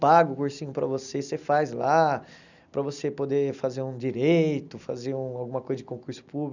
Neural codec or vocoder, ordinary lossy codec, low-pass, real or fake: none; none; 7.2 kHz; real